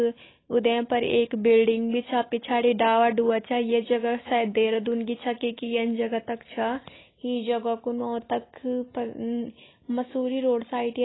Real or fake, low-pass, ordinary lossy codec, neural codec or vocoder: real; 7.2 kHz; AAC, 16 kbps; none